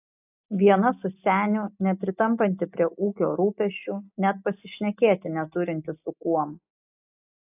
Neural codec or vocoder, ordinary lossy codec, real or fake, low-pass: none; AAC, 32 kbps; real; 3.6 kHz